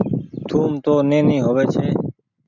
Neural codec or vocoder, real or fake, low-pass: none; real; 7.2 kHz